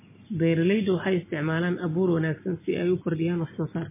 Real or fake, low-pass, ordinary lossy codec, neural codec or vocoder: real; 3.6 kHz; MP3, 16 kbps; none